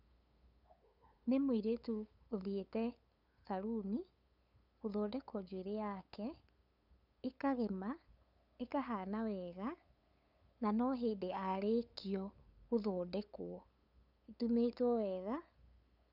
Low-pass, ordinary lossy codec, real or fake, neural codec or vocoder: 5.4 kHz; none; fake; codec, 16 kHz, 8 kbps, FunCodec, trained on LibriTTS, 25 frames a second